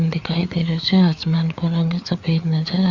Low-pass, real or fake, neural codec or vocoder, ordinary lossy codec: 7.2 kHz; fake; codec, 16 kHz, 4 kbps, FreqCodec, larger model; Opus, 64 kbps